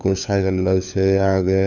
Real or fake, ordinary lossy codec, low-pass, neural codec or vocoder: fake; none; 7.2 kHz; codec, 16 kHz, 4 kbps, FunCodec, trained on LibriTTS, 50 frames a second